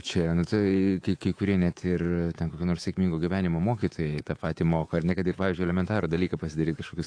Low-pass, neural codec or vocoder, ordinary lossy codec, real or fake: 9.9 kHz; codec, 24 kHz, 3.1 kbps, DualCodec; AAC, 48 kbps; fake